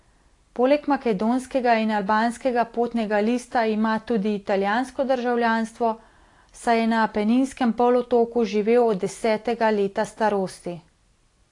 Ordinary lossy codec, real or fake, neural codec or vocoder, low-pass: AAC, 48 kbps; real; none; 10.8 kHz